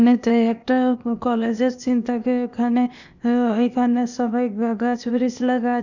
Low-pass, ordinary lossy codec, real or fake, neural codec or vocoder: 7.2 kHz; none; fake; codec, 16 kHz, 0.8 kbps, ZipCodec